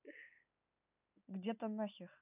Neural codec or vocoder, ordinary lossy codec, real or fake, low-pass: none; none; real; 3.6 kHz